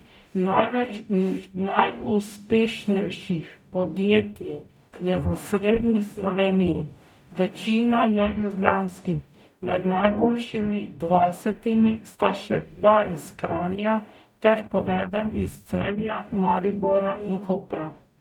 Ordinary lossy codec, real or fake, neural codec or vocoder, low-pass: none; fake; codec, 44.1 kHz, 0.9 kbps, DAC; 19.8 kHz